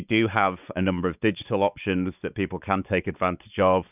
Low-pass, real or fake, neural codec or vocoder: 3.6 kHz; fake; codec, 16 kHz, 4 kbps, X-Codec, WavLM features, trained on Multilingual LibriSpeech